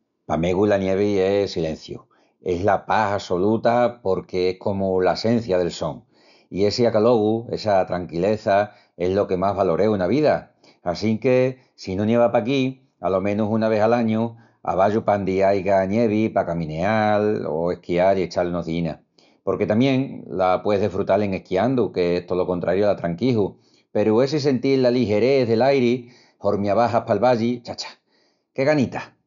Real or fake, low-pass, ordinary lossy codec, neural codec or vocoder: real; 7.2 kHz; MP3, 96 kbps; none